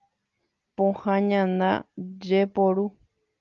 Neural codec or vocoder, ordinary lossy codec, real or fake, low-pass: none; Opus, 32 kbps; real; 7.2 kHz